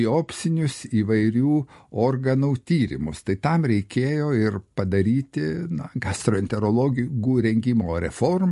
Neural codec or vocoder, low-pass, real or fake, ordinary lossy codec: none; 14.4 kHz; real; MP3, 48 kbps